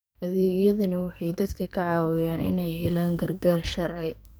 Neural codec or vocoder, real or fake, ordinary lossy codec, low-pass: codec, 44.1 kHz, 2.6 kbps, SNAC; fake; none; none